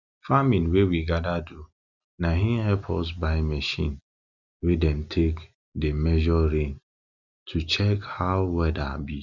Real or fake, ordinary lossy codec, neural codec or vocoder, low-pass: real; none; none; 7.2 kHz